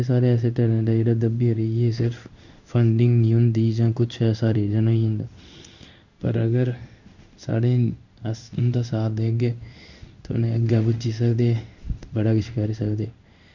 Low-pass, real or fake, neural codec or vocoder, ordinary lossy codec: 7.2 kHz; fake; codec, 16 kHz in and 24 kHz out, 1 kbps, XY-Tokenizer; none